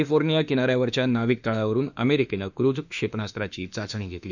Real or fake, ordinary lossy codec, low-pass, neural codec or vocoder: fake; none; 7.2 kHz; autoencoder, 48 kHz, 32 numbers a frame, DAC-VAE, trained on Japanese speech